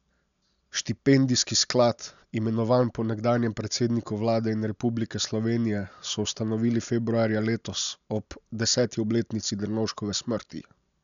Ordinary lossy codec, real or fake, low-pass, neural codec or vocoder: none; real; 7.2 kHz; none